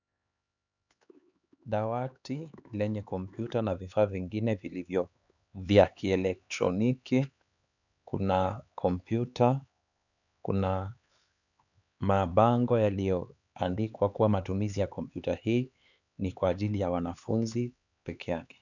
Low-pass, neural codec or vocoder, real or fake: 7.2 kHz; codec, 16 kHz, 4 kbps, X-Codec, HuBERT features, trained on LibriSpeech; fake